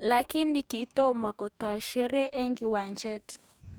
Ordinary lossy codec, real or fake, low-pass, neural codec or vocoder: none; fake; none; codec, 44.1 kHz, 2.6 kbps, DAC